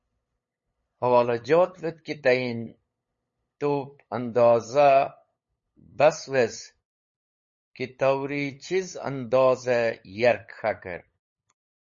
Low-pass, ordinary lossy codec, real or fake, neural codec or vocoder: 7.2 kHz; MP3, 32 kbps; fake; codec, 16 kHz, 8 kbps, FunCodec, trained on LibriTTS, 25 frames a second